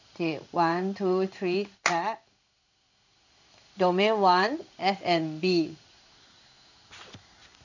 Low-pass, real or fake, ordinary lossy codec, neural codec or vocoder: 7.2 kHz; fake; none; codec, 16 kHz in and 24 kHz out, 1 kbps, XY-Tokenizer